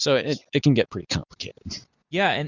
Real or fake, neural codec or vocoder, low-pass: real; none; 7.2 kHz